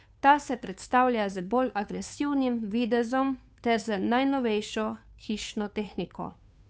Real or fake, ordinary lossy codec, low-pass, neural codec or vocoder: fake; none; none; codec, 16 kHz, 2 kbps, FunCodec, trained on Chinese and English, 25 frames a second